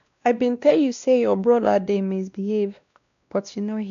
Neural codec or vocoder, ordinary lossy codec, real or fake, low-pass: codec, 16 kHz, 1 kbps, X-Codec, WavLM features, trained on Multilingual LibriSpeech; none; fake; 7.2 kHz